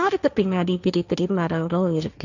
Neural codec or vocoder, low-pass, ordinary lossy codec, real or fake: codec, 16 kHz, 1.1 kbps, Voila-Tokenizer; 7.2 kHz; none; fake